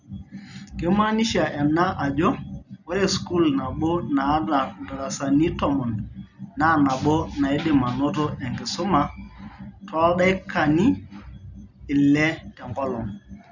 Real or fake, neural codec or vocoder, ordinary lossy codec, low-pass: real; none; none; 7.2 kHz